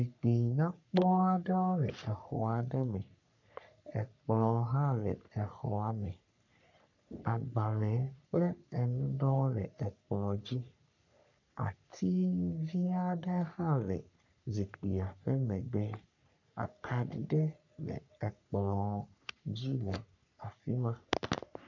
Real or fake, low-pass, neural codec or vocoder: fake; 7.2 kHz; codec, 44.1 kHz, 3.4 kbps, Pupu-Codec